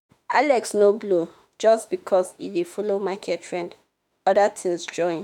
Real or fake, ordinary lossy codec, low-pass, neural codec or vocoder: fake; none; 19.8 kHz; autoencoder, 48 kHz, 32 numbers a frame, DAC-VAE, trained on Japanese speech